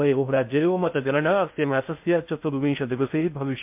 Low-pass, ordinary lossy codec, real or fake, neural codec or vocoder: 3.6 kHz; MP3, 32 kbps; fake; codec, 16 kHz in and 24 kHz out, 0.6 kbps, FocalCodec, streaming, 2048 codes